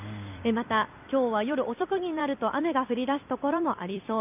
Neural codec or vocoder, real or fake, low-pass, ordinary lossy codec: vocoder, 44.1 kHz, 128 mel bands every 256 samples, BigVGAN v2; fake; 3.6 kHz; none